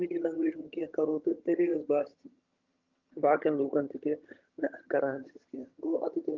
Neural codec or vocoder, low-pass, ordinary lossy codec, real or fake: vocoder, 22.05 kHz, 80 mel bands, HiFi-GAN; 7.2 kHz; Opus, 24 kbps; fake